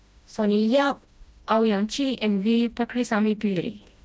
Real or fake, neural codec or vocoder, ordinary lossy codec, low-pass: fake; codec, 16 kHz, 1 kbps, FreqCodec, smaller model; none; none